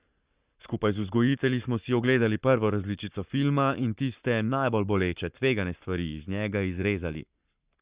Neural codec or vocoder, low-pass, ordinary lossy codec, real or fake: codec, 44.1 kHz, 7.8 kbps, Pupu-Codec; 3.6 kHz; Opus, 64 kbps; fake